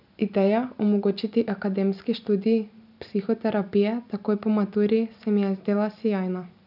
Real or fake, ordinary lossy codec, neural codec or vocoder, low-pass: real; none; none; 5.4 kHz